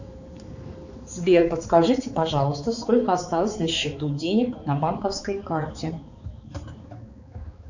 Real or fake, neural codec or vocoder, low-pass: fake; codec, 16 kHz, 4 kbps, X-Codec, HuBERT features, trained on balanced general audio; 7.2 kHz